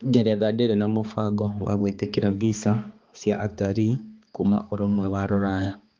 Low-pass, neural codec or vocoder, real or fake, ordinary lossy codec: 7.2 kHz; codec, 16 kHz, 2 kbps, X-Codec, HuBERT features, trained on balanced general audio; fake; Opus, 32 kbps